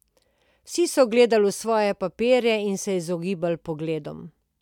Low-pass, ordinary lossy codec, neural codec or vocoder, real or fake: 19.8 kHz; none; none; real